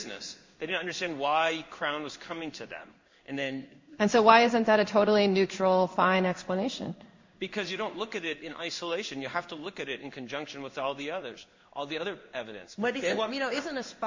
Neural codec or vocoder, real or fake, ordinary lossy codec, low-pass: codec, 16 kHz in and 24 kHz out, 1 kbps, XY-Tokenizer; fake; MP3, 64 kbps; 7.2 kHz